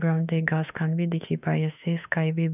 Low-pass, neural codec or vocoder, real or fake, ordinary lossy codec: 3.6 kHz; autoencoder, 48 kHz, 32 numbers a frame, DAC-VAE, trained on Japanese speech; fake; none